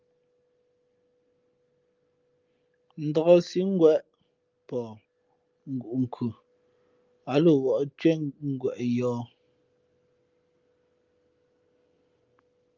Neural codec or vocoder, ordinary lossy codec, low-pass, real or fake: none; Opus, 24 kbps; 7.2 kHz; real